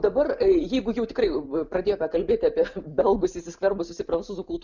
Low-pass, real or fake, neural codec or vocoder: 7.2 kHz; real; none